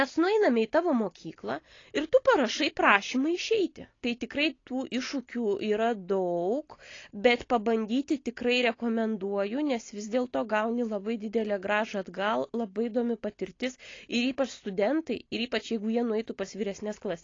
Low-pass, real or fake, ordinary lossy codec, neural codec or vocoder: 7.2 kHz; real; AAC, 32 kbps; none